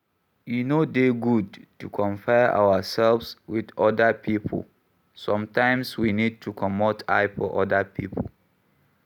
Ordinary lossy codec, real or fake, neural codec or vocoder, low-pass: none; real; none; 19.8 kHz